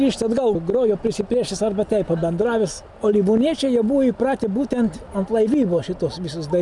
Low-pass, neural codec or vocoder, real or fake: 10.8 kHz; none; real